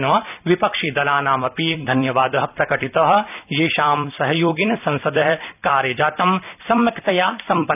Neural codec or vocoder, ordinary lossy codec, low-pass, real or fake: vocoder, 44.1 kHz, 128 mel bands every 256 samples, BigVGAN v2; none; 3.6 kHz; fake